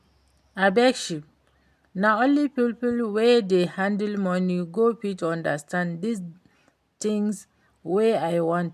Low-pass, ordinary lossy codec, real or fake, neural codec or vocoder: 14.4 kHz; MP3, 96 kbps; real; none